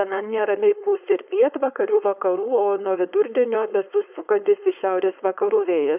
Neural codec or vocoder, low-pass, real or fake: codec, 16 kHz, 4.8 kbps, FACodec; 3.6 kHz; fake